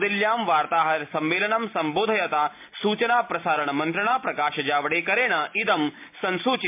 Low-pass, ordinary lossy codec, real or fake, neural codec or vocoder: 3.6 kHz; MP3, 32 kbps; real; none